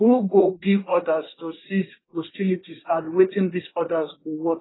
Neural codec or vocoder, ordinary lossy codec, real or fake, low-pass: codec, 24 kHz, 1 kbps, SNAC; AAC, 16 kbps; fake; 7.2 kHz